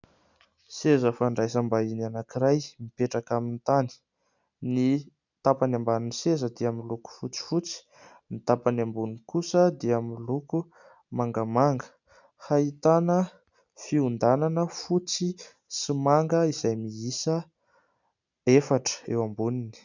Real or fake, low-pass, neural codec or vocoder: real; 7.2 kHz; none